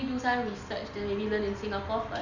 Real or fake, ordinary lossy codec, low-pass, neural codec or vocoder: real; none; 7.2 kHz; none